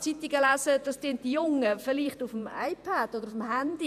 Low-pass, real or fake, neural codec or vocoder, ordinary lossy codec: 14.4 kHz; fake; vocoder, 48 kHz, 128 mel bands, Vocos; none